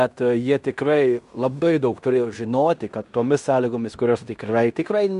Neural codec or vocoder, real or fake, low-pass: codec, 16 kHz in and 24 kHz out, 0.9 kbps, LongCat-Audio-Codec, fine tuned four codebook decoder; fake; 10.8 kHz